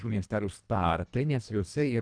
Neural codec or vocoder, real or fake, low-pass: codec, 24 kHz, 1.5 kbps, HILCodec; fake; 9.9 kHz